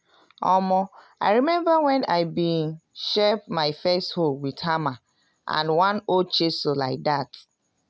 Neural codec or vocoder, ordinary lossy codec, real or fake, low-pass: none; none; real; none